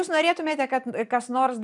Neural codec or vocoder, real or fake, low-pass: none; real; 10.8 kHz